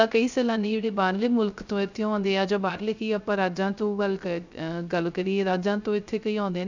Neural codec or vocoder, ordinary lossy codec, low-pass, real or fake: codec, 16 kHz, 0.3 kbps, FocalCodec; none; 7.2 kHz; fake